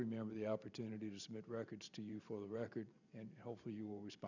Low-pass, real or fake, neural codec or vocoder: 7.2 kHz; fake; vocoder, 44.1 kHz, 128 mel bands every 512 samples, BigVGAN v2